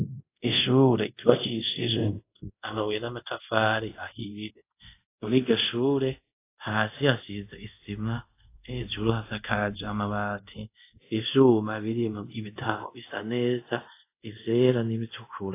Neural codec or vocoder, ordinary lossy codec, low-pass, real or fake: codec, 24 kHz, 0.5 kbps, DualCodec; AAC, 24 kbps; 3.6 kHz; fake